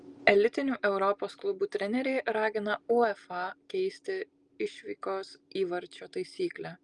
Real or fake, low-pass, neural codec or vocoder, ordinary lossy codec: real; 10.8 kHz; none; Opus, 32 kbps